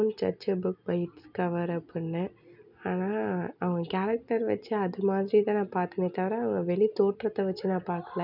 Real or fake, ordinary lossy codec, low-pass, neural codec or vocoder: real; none; 5.4 kHz; none